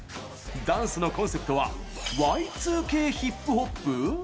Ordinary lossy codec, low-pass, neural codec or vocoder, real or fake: none; none; none; real